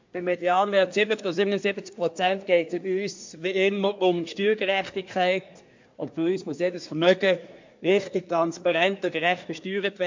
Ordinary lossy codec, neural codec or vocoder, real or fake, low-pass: MP3, 48 kbps; codec, 24 kHz, 1 kbps, SNAC; fake; 7.2 kHz